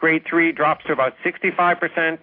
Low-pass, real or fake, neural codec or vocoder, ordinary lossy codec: 5.4 kHz; real; none; AAC, 32 kbps